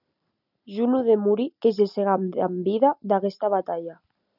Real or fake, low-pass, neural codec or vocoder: real; 5.4 kHz; none